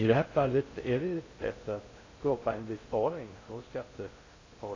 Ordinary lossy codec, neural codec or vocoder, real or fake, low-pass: AAC, 32 kbps; codec, 16 kHz in and 24 kHz out, 0.6 kbps, FocalCodec, streaming, 2048 codes; fake; 7.2 kHz